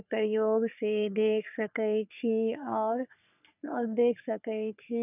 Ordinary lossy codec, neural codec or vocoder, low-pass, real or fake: none; codec, 44.1 kHz, 3.4 kbps, Pupu-Codec; 3.6 kHz; fake